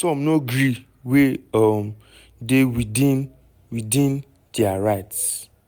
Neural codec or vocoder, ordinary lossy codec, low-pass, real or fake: none; none; none; real